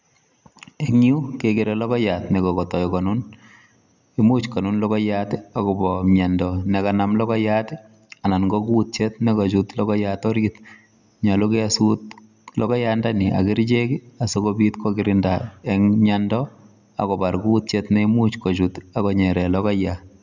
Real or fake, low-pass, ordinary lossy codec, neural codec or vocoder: real; 7.2 kHz; none; none